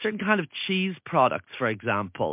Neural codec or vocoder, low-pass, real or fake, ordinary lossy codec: none; 3.6 kHz; real; MP3, 32 kbps